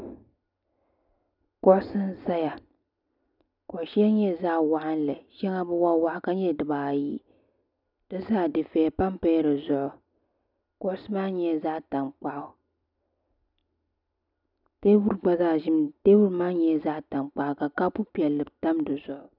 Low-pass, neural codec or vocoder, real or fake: 5.4 kHz; none; real